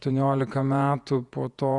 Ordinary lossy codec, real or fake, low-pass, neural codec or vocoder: Opus, 64 kbps; fake; 10.8 kHz; vocoder, 48 kHz, 128 mel bands, Vocos